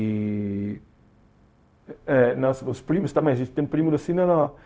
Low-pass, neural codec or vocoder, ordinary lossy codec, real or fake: none; codec, 16 kHz, 0.4 kbps, LongCat-Audio-Codec; none; fake